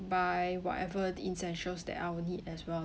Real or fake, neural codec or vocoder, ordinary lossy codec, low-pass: real; none; none; none